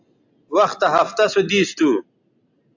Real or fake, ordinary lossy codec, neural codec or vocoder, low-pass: real; AAC, 48 kbps; none; 7.2 kHz